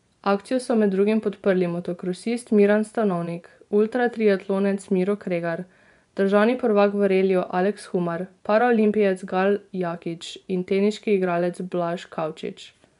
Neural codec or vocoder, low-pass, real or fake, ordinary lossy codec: vocoder, 24 kHz, 100 mel bands, Vocos; 10.8 kHz; fake; none